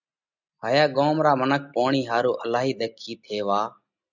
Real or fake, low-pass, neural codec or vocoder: real; 7.2 kHz; none